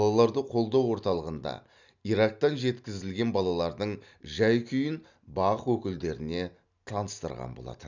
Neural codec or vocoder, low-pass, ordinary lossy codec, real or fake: none; 7.2 kHz; none; real